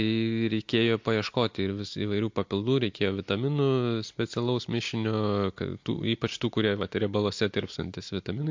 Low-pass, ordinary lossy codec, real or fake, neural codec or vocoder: 7.2 kHz; MP3, 48 kbps; real; none